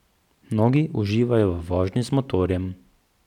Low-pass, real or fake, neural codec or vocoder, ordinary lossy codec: 19.8 kHz; fake; vocoder, 44.1 kHz, 128 mel bands every 512 samples, BigVGAN v2; none